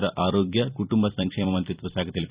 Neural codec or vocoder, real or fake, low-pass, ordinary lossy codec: vocoder, 44.1 kHz, 128 mel bands every 256 samples, BigVGAN v2; fake; 3.6 kHz; Opus, 64 kbps